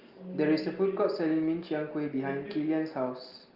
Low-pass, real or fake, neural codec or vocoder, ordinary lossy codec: 5.4 kHz; real; none; Opus, 32 kbps